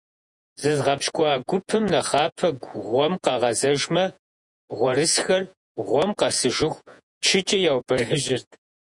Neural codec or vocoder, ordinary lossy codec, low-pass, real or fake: vocoder, 48 kHz, 128 mel bands, Vocos; MP3, 96 kbps; 10.8 kHz; fake